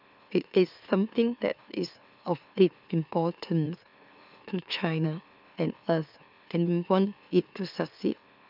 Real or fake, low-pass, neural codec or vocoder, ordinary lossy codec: fake; 5.4 kHz; autoencoder, 44.1 kHz, a latent of 192 numbers a frame, MeloTTS; none